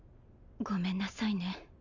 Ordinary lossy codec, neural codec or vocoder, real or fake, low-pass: none; none; real; 7.2 kHz